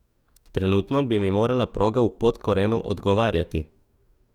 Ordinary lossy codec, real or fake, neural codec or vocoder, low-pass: none; fake; codec, 44.1 kHz, 2.6 kbps, DAC; 19.8 kHz